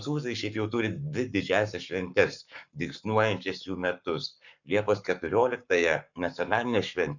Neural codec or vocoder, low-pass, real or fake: codec, 44.1 kHz, 7.8 kbps, Pupu-Codec; 7.2 kHz; fake